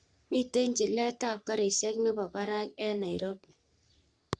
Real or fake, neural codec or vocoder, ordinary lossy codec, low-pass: fake; codec, 44.1 kHz, 3.4 kbps, Pupu-Codec; Opus, 64 kbps; 9.9 kHz